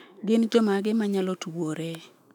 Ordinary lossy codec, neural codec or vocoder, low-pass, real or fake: none; autoencoder, 48 kHz, 128 numbers a frame, DAC-VAE, trained on Japanese speech; 19.8 kHz; fake